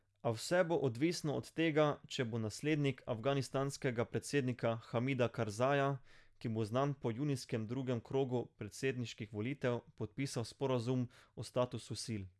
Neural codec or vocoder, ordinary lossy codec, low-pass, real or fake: none; none; none; real